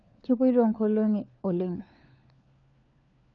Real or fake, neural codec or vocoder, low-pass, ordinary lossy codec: fake; codec, 16 kHz, 4 kbps, FunCodec, trained on LibriTTS, 50 frames a second; 7.2 kHz; MP3, 96 kbps